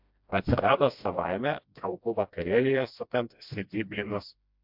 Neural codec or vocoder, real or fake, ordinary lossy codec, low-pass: codec, 16 kHz, 1 kbps, FreqCodec, smaller model; fake; MP3, 48 kbps; 5.4 kHz